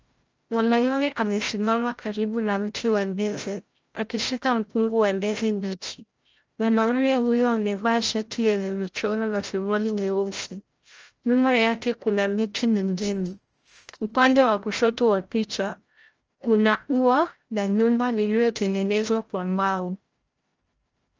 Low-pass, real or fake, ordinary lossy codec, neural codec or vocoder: 7.2 kHz; fake; Opus, 24 kbps; codec, 16 kHz, 0.5 kbps, FreqCodec, larger model